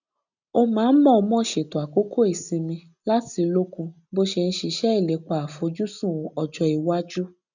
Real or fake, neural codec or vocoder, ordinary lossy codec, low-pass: real; none; none; 7.2 kHz